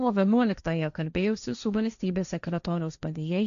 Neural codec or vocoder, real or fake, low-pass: codec, 16 kHz, 1.1 kbps, Voila-Tokenizer; fake; 7.2 kHz